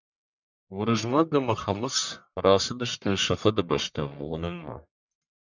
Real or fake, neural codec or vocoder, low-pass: fake; codec, 44.1 kHz, 1.7 kbps, Pupu-Codec; 7.2 kHz